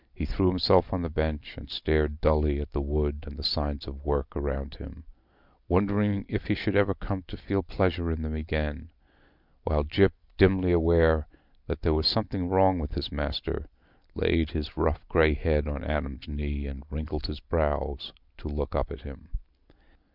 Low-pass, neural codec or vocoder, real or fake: 5.4 kHz; none; real